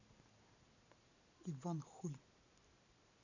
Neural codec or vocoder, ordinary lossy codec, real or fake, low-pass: none; none; real; 7.2 kHz